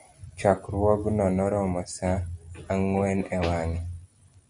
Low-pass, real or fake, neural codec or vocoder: 9.9 kHz; real; none